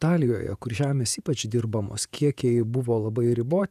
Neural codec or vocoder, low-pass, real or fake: vocoder, 48 kHz, 128 mel bands, Vocos; 14.4 kHz; fake